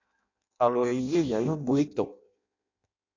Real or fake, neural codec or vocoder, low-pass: fake; codec, 16 kHz in and 24 kHz out, 0.6 kbps, FireRedTTS-2 codec; 7.2 kHz